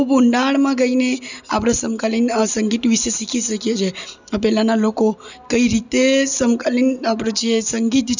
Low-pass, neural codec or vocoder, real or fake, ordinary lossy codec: 7.2 kHz; none; real; none